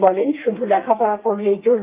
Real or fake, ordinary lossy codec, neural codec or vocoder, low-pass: fake; Opus, 24 kbps; codec, 32 kHz, 1.9 kbps, SNAC; 3.6 kHz